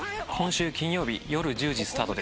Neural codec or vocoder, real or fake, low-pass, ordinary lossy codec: none; real; none; none